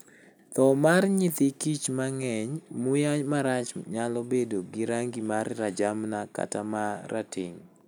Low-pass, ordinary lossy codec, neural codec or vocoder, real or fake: none; none; none; real